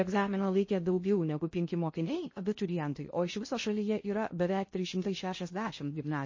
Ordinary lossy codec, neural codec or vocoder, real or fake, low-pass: MP3, 32 kbps; codec, 16 kHz in and 24 kHz out, 0.6 kbps, FocalCodec, streaming, 2048 codes; fake; 7.2 kHz